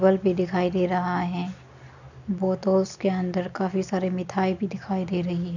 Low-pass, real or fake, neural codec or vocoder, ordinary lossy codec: 7.2 kHz; real; none; none